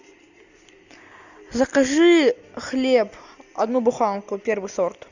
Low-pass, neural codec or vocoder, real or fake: 7.2 kHz; none; real